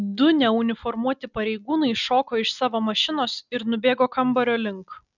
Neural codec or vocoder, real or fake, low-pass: none; real; 7.2 kHz